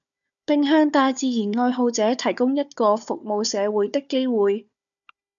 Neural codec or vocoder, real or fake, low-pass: codec, 16 kHz, 4 kbps, FunCodec, trained on Chinese and English, 50 frames a second; fake; 7.2 kHz